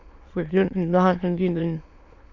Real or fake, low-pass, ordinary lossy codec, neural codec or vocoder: fake; 7.2 kHz; AAC, 48 kbps; autoencoder, 22.05 kHz, a latent of 192 numbers a frame, VITS, trained on many speakers